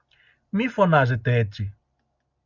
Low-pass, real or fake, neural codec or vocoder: 7.2 kHz; real; none